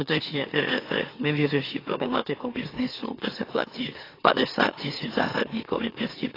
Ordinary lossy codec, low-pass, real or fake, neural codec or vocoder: AAC, 24 kbps; 5.4 kHz; fake; autoencoder, 44.1 kHz, a latent of 192 numbers a frame, MeloTTS